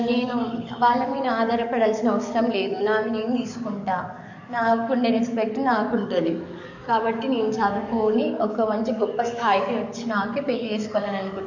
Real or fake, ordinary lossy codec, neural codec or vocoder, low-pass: fake; none; codec, 44.1 kHz, 7.8 kbps, DAC; 7.2 kHz